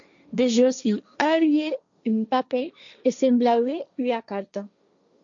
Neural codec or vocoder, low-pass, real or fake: codec, 16 kHz, 1.1 kbps, Voila-Tokenizer; 7.2 kHz; fake